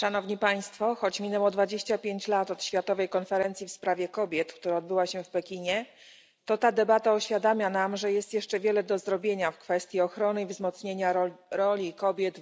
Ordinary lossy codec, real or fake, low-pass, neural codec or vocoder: none; real; none; none